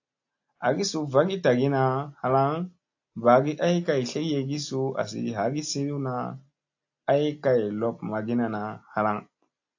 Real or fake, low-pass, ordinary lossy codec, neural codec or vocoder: real; 7.2 kHz; AAC, 48 kbps; none